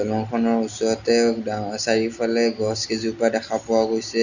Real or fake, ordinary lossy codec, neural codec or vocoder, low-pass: real; none; none; 7.2 kHz